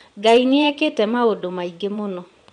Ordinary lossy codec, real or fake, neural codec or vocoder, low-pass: none; fake; vocoder, 22.05 kHz, 80 mel bands, Vocos; 9.9 kHz